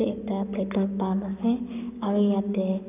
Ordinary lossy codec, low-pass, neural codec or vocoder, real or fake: none; 3.6 kHz; codec, 44.1 kHz, 7.8 kbps, Pupu-Codec; fake